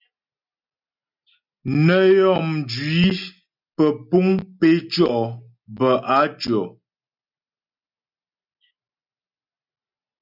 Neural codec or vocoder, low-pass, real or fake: none; 5.4 kHz; real